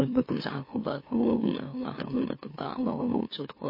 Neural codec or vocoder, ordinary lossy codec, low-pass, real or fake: autoencoder, 44.1 kHz, a latent of 192 numbers a frame, MeloTTS; MP3, 24 kbps; 5.4 kHz; fake